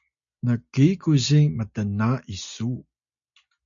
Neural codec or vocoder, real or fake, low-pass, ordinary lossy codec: none; real; 7.2 kHz; MP3, 64 kbps